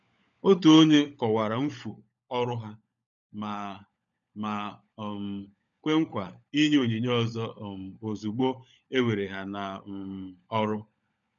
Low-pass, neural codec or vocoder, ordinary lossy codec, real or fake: 7.2 kHz; codec, 16 kHz, 16 kbps, FunCodec, trained on LibriTTS, 50 frames a second; MP3, 96 kbps; fake